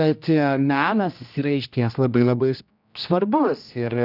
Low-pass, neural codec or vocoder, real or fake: 5.4 kHz; codec, 16 kHz, 1 kbps, X-Codec, HuBERT features, trained on general audio; fake